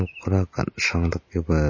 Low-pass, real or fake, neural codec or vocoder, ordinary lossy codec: 7.2 kHz; real; none; MP3, 32 kbps